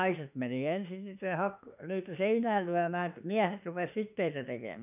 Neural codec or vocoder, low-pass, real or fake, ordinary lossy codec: autoencoder, 48 kHz, 32 numbers a frame, DAC-VAE, trained on Japanese speech; 3.6 kHz; fake; none